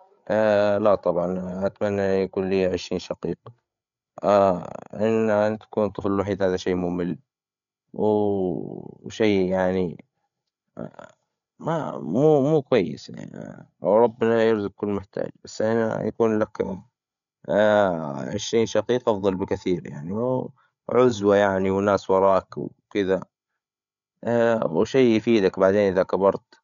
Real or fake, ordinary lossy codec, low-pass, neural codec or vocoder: fake; none; 7.2 kHz; codec, 16 kHz, 8 kbps, FreqCodec, larger model